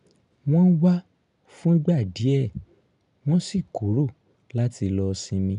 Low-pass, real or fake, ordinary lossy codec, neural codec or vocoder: 10.8 kHz; real; none; none